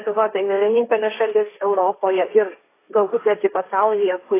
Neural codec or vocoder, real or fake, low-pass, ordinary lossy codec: codec, 16 kHz, 1.1 kbps, Voila-Tokenizer; fake; 3.6 kHz; AAC, 24 kbps